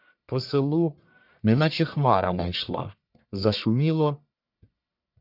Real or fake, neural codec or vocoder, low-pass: fake; codec, 44.1 kHz, 1.7 kbps, Pupu-Codec; 5.4 kHz